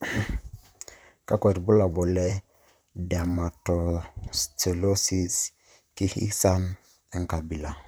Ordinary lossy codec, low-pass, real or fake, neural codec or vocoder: none; none; fake; vocoder, 44.1 kHz, 128 mel bands, Pupu-Vocoder